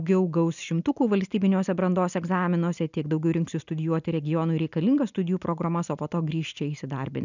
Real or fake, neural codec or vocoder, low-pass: real; none; 7.2 kHz